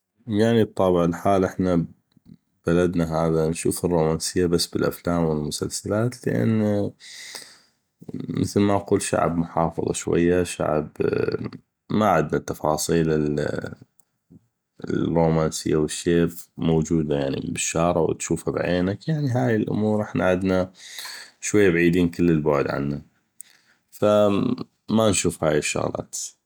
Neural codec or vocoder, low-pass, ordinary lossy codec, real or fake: none; none; none; real